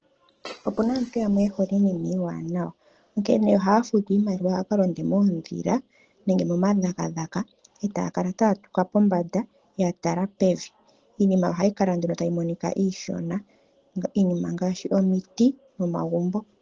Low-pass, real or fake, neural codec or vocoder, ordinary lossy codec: 7.2 kHz; real; none; Opus, 16 kbps